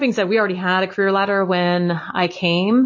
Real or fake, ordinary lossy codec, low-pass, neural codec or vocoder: real; MP3, 32 kbps; 7.2 kHz; none